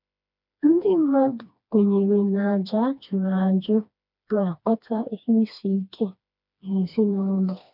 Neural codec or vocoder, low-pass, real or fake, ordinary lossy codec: codec, 16 kHz, 2 kbps, FreqCodec, smaller model; 5.4 kHz; fake; none